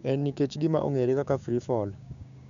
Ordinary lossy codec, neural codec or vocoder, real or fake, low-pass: none; codec, 16 kHz, 6 kbps, DAC; fake; 7.2 kHz